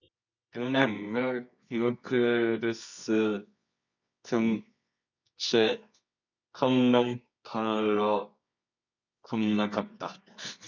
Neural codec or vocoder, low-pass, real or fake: codec, 24 kHz, 0.9 kbps, WavTokenizer, medium music audio release; 7.2 kHz; fake